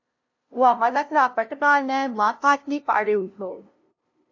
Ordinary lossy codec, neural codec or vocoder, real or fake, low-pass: AAC, 48 kbps; codec, 16 kHz, 0.5 kbps, FunCodec, trained on LibriTTS, 25 frames a second; fake; 7.2 kHz